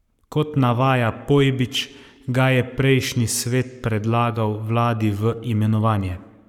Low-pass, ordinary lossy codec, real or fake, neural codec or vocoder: 19.8 kHz; none; fake; codec, 44.1 kHz, 7.8 kbps, Pupu-Codec